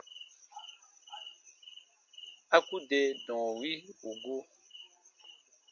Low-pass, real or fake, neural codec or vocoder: 7.2 kHz; real; none